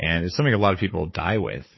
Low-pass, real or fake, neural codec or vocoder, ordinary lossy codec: 7.2 kHz; fake; codec, 16 kHz, 4 kbps, FunCodec, trained on Chinese and English, 50 frames a second; MP3, 24 kbps